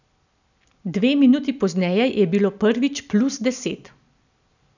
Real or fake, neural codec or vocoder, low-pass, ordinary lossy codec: real; none; 7.2 kHz; none